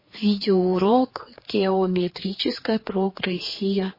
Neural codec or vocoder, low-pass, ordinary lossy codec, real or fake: codec, 16 kHz, 4 kbps, X-Codec, HuBERT features, trained on general audio; 5.4 kHz; MP3, 24 kbps; fake